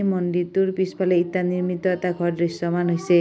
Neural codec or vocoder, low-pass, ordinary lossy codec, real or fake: none; none; none; real